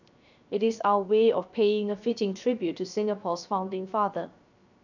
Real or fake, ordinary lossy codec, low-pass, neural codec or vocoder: fake; none; 7.2 kHz; codec, 16 kHz, 0.7 kbps, FocalCodec